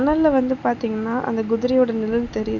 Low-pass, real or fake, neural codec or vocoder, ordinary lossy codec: 7.2 kHz; real; none; none